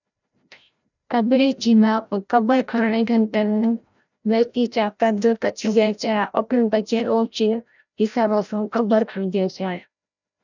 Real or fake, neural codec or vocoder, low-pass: fake; codec, 16 kHz, 0.5 kbps, FreqCodec, larger model; 7.2 kHz